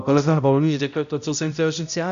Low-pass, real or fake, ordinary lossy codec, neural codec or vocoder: 7.2 kHz; fake; MP3, 96 kbps; codec, 16 kHz, 0.5 kbps, X-Codec, HuBERT features, trained on balanced general audio